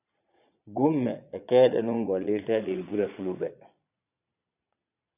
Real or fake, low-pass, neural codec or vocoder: fake; 3.6 kHz; vocoder, 22.05 kHz, 80 mel bands, Vocos